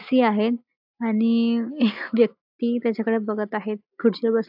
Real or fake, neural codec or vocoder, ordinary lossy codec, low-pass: real; none; AAC, 48 kbps; 5.4 kHz